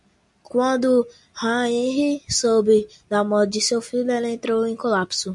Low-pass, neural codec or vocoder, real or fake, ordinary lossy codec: 10.8 kHz; none; real; MP3, 64 kbps